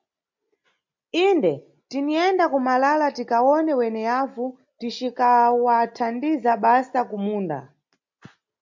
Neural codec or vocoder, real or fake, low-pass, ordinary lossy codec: none; real; 7.2 kHz; MP3, 48 kbps